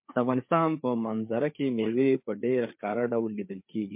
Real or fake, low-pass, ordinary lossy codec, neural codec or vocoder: fake; 3.6 kHz; MP3, 32 kbps; codec, 16 kHz, 4 kbps, FreqCodec, larger model